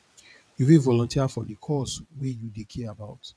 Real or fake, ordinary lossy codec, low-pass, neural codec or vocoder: fake; none; none; vocoder, 22.05 kHz, 80 mel bands, WaveNeXt